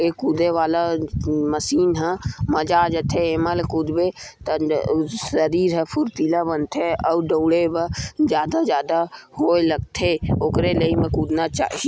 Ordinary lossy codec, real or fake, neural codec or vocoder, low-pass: none; real; none; none